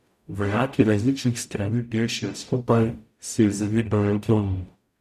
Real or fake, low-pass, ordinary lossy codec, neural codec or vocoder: fake; 14.4 kHz; none; codec, 44.1 kHz, 0.9 kbps, DAC